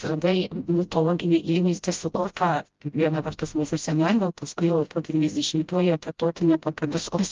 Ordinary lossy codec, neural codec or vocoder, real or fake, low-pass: Opus, 32 kbps; codec, 16 kHz, 0.5 kbps, FreqCodec, smaller model; fake; 7.2 kHz